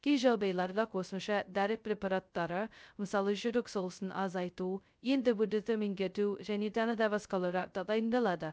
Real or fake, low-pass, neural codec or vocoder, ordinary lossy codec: fake; none; codec, 16 kHz, 0.2 kbps, FocalCodec; none